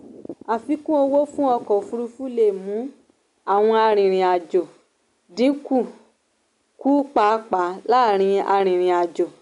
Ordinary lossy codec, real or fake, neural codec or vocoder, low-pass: none; real; none; 10.8 kHz